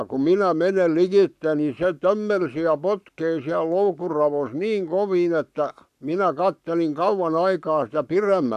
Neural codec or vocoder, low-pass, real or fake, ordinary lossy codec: codec, 44.1 kHz, 7.8 kbps, Pupu-Codec; 14.4 kHz; fake; none